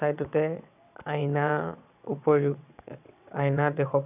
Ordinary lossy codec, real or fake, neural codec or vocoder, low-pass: none; fake; vocoder, 22.05 kHz, 80 mel bands, WaveNeXt; 3.6 kHz